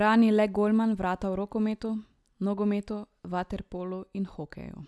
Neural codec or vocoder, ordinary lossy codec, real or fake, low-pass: none; none; real; none